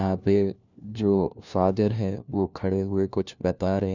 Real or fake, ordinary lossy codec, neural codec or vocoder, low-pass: fake; none; codec, 16 kHz, 1 kbps, FunCodec, trained on LibriTTS, 50 frames a second; 7.2 kHz